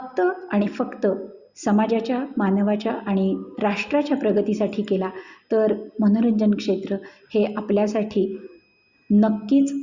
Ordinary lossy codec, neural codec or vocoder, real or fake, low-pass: Opus, 64 kbps; none; real; 7.2 kHz